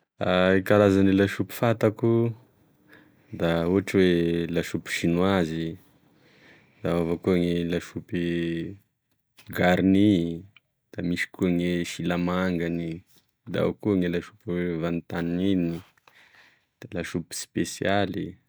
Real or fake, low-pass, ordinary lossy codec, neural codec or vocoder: real; none; none; none